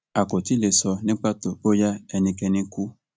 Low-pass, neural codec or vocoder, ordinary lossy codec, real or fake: none; none; none; real